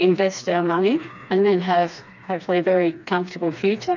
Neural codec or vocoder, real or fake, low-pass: codec, 16 kHz, 2 kbps, FreqCodec, smaller model; fake; 7.2 kHz